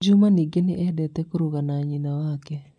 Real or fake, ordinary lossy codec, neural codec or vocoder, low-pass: real; none; none; none